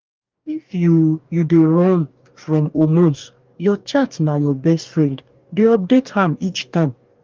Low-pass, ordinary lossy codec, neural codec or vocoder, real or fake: 7.2 kHz; Opus, 24 kbps; codec, 44.1 kHz, 2.6 kbps, DAC; fake